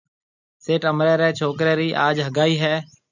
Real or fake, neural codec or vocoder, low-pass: real; none; 7.2 kHz